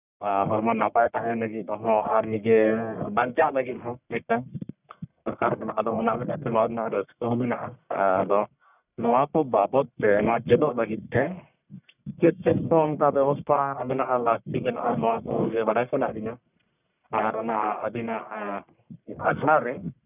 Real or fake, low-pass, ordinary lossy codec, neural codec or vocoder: fake; 3.6 kHz; none; codec, 44.1 kHz, 1.7 kbps, Pupu-Codec